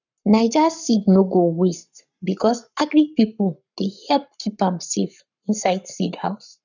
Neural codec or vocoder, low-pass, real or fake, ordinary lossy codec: codec, 44.1 kHz, 7.8 kbps, Pupu-Codec; 7.2 kHz; fake; none